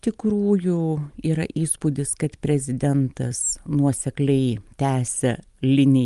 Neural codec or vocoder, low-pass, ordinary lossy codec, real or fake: none; 10.8 kHz; Opus, 32 kbps; real